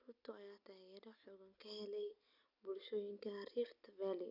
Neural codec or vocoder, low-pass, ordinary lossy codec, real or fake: none; 5.4 kHz; none; real